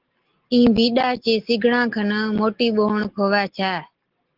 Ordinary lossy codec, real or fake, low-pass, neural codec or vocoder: Opus, 16 kbps; real; 5.4 kHz; none